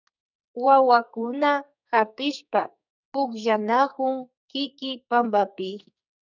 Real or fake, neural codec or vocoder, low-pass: fake; codec, 44.1 kHz, 2.6 kbps, SNAC; 7.2 kHz